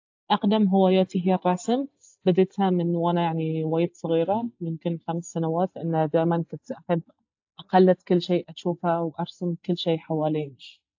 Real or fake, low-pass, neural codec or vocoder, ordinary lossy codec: real; 7.2 kHz; none; AAC, 48 kbps